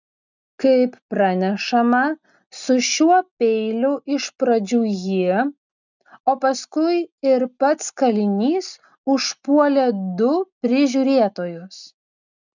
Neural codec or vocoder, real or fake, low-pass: none; real; 7.2 kHz